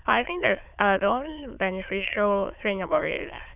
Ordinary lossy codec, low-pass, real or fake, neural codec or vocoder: Opus, 64 kbps; 3.6 kHz; fake; autoencoder, 22.05 kHz, a latent of 192 numbers a frame, VITS, trained on many speakers